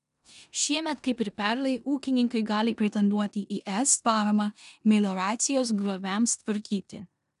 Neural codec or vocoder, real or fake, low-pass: codec, 16 kHz in and 24 kHz out, 0.9 kbps, LongCat-Audio-Codec, four codebook decoder; fake; 10.8 kHz